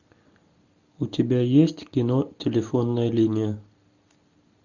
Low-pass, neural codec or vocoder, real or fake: 7.2 kHz; none; real